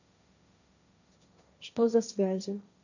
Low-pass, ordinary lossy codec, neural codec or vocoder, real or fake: 7.2 kHz; none; codec, 16 kHz, 1.1 kbps, Voila-Tokenizer; fake